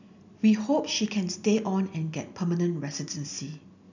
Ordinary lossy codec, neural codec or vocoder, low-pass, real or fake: none; none; 7.2 kHz; real